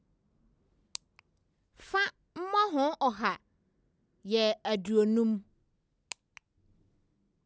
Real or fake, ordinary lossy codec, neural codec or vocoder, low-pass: real; none; none; none